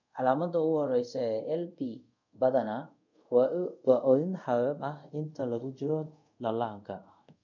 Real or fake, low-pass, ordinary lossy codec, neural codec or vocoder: fake; 7.2 kHz; none; codec, 24 kHz, 0.5 kbps, DualCodec